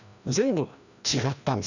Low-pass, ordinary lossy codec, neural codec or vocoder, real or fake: 7.2 kHz; none; codec, 16 kHz, 1 kbps, FreqCodec, larger model; fake